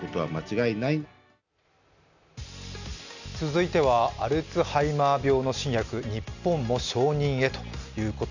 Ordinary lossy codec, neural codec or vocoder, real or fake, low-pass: none; none; real; 7.2 kHz